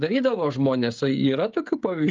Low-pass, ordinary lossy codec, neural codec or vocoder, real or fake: 7.2 kHz; Opus, 24 kbps; codec, 16 kHz, 16 kbps, FreqCodec, smaller model; fake